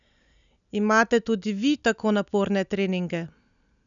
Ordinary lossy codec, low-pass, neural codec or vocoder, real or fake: none; 7.2 kHz; none; real